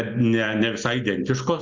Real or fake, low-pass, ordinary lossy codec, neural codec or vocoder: real; 7.2 kHz; Opus, 32 kbps; none